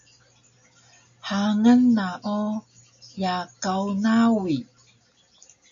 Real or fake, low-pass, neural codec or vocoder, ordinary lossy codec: real; 7.2 kHz; none; MP3, 64 kbps